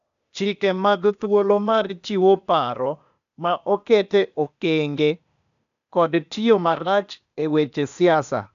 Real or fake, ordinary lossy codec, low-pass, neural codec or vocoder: fake; none; 7.2 kHz; codec, 16 kHz, 0.8 kbps, ZipCodec